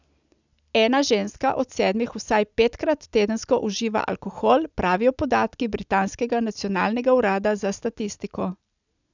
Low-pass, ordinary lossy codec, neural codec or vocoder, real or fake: 7.2 kHz; none; none; real